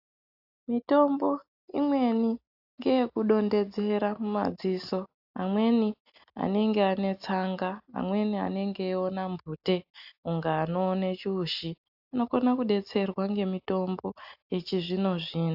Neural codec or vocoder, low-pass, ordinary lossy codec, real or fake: none; 5.4 kHz; AAC, 48 kbps; real